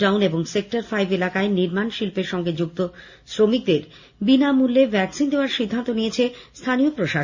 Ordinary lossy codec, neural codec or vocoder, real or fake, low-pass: Opus, 64 kbps; none; real; 7.2 kHz